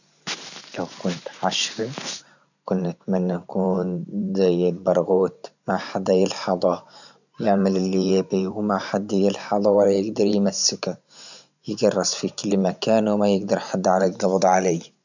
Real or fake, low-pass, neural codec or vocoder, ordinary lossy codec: fake; 7.2 kHz; vocoder, 44.1 kHz, 128 mel bands every 512 samples, BigVGAN v2; none